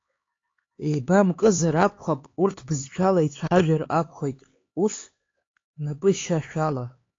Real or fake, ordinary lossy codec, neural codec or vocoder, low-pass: fake; AAC, 32 kbps; codec, 16 kHz, 4 kbps, X-Codec, HuBERT features, trained on LibriSpeech; 7.2 kHz